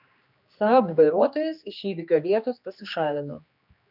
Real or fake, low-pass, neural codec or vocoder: fake; 5.4 kHz; codec, 16 kHz, 2 kbps, X-Codec, HuBERT features, trained on general audio